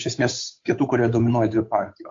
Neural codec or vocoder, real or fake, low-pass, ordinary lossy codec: codec, 16 kHz, 8 kbps, FunCodec, trained on Chinese and English, 25 frames a second; fake; 7.2 kHz; AAC, 48 kbps